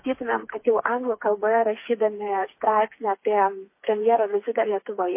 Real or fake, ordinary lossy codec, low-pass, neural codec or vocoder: fake; MP3, 24 kbps; 3.6 kHz; codec, 16 kHz, 4 kbps, FreqCodec, smaller model